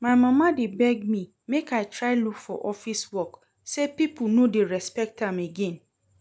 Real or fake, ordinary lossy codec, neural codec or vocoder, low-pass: real; none; none; none